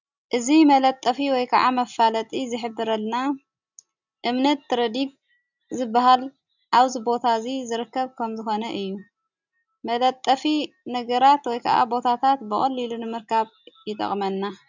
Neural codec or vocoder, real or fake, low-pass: none; real; 7.2 kHz